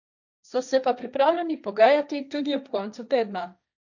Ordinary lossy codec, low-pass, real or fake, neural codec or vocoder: none; 7.2 kHz; fake; codec, 16 kHz, 1.1 kbps, Voila-Tokenizer